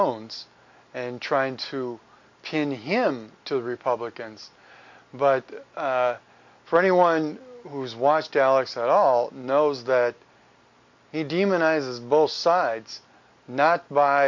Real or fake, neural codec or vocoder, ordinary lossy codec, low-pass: real; none; MP3, 48 kbps; 7.2 kHz